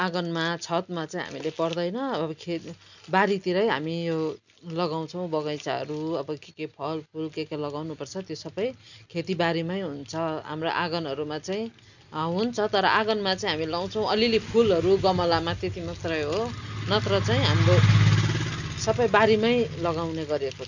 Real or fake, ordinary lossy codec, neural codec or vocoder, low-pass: real; none; none; 7.2 kHz